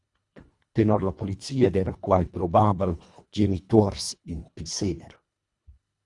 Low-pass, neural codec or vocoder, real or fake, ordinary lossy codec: 10.8 kHz; codec, 24 kHz, 1.5 kbps, HILCodec; fake; Opus, 64 kbps